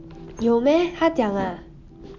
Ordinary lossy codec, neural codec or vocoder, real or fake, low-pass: MP3, 64 kbps; none; real; 7.2 kHz